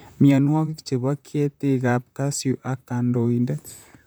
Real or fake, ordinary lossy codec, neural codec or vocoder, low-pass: fake; none; vocoder, 44.1 kHz, 128 mel bands, Pupu-Vocoder; none